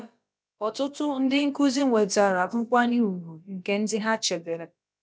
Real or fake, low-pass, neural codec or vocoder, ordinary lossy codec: fake; none; codec, 16 kHz, about 1 kbps, DyCAST, with the encoder's durations; none